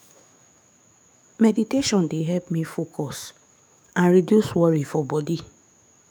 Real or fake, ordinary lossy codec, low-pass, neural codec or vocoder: fake; none; none; autoencoder, 48 kHz, 128 numbers a frame, DAC-VAE, trained on Japanese speech